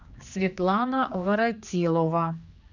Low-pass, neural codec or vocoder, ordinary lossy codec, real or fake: 7.2 kHz; codec, 16 kHz, 2 kbps, X-Codec, HuBERT features, trained on general audio; Opus, 64 kbps; fake